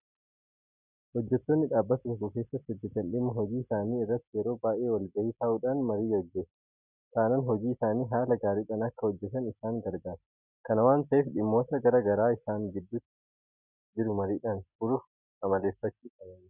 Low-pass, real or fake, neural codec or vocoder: 3.6 kHz; real; none